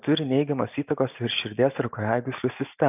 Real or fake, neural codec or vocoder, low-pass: real; none; 3.6 kHz